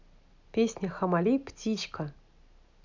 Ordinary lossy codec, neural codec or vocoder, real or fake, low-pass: none; none; real; 7.2 kHz